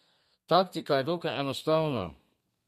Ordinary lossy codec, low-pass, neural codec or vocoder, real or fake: MP3, 64 kbps; 14.4 kHz; codec, 32 kHz, 1.9 kbps, SNAC; fake